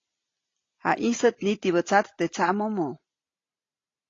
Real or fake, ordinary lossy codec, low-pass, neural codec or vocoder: real; AAC, 32 kbps; 7.2 kHz; none